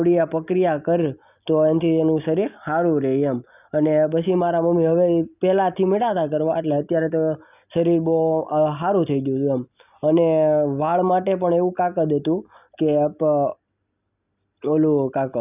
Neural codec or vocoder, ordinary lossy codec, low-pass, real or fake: none; none; 3.6 kHz; real